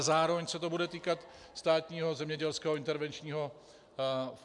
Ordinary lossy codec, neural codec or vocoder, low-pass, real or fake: MP3, 96 kbps; none; 10.8 kHz; real